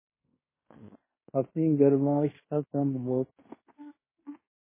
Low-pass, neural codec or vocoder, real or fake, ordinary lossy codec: 3.6 kHz; codec, 16 kHz in and 24 kHz out, 0.9 kbps, LongCat-Audio-Codec, fine tuned four codebook decoder; fake; MP3, 16 kbps